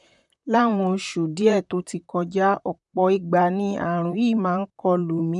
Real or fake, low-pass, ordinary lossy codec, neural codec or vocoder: fake; 10.8 kHz; none; vocoder, 44.1 kHz, 128 mel bands, Pupu-Vocoder